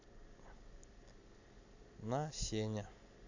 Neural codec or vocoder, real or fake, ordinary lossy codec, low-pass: none; real; AAC, 48 kbps; 7.2 kHz